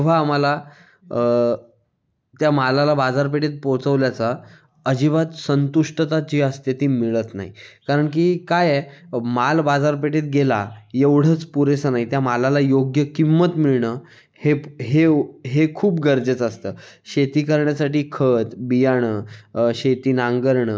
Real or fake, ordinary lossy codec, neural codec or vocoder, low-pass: real; none; none; none